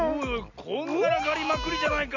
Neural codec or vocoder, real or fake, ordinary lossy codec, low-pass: none; real; none; 7.2 kHz